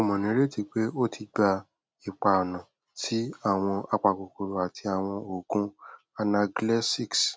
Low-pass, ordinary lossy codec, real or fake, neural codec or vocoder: none; none; real; none